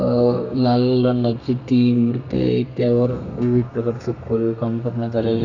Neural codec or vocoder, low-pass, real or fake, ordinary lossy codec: codec, 32 kHz, 1.9 kbps, SNAC; 7.2 kHz; fake; none